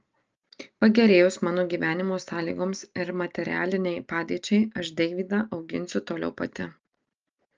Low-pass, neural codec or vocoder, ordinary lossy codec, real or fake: 7.2 kHz; none; Opus, 24 kbps; real